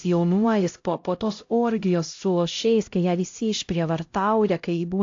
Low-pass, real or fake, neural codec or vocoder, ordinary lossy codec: 7.2 kHz; fake; codec, 16 kHz, 0.5 kbps, X-Codec, HuBERT features, trained on LibriSpeech; AAC, 48 kbps